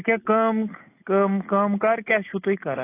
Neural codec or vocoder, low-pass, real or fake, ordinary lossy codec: none; 3.6 kHz; real; AAC, 24 kbps